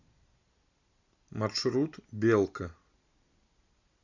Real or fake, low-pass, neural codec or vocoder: real; 7.2 kHz; none